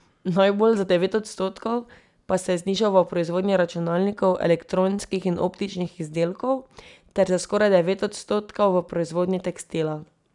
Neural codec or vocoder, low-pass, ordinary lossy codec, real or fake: none; 10.8 kHz; none; real